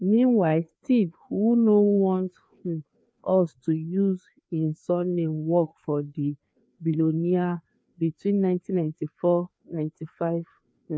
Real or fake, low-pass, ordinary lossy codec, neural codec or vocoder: fake; none; none; codec, 16 kHz, 2 kbps, FreqCodec, larger model